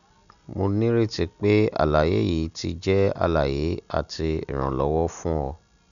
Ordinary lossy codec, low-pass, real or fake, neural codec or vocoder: none; 7.2 kHz; real; none